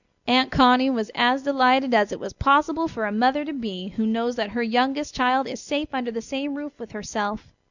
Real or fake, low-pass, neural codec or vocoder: real; 7.2 kHz; none